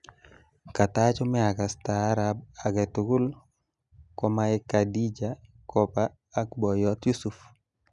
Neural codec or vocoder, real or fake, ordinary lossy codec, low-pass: none; real; none; 10.8 kHz